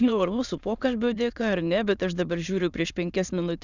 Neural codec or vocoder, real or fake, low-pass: autoencoder, 22.05 kHz, a latent of 192 numbers a frame, VITS, trained on many speakers; fake; 7.2 kHz